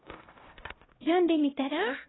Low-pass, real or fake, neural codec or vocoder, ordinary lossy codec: 7.2 kHz; fake; codec, 16 kHz, 0.5 kbps, X-Codec, HuBERT features, trained on LibriSpeech; AAC, 16 kbps